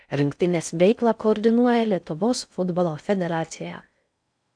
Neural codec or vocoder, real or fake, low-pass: codec, 16 kHz in and 24 kHz out, 0.6 kbps, FocalCodec, streaming, 4096 codes; fake; 9.9 kHz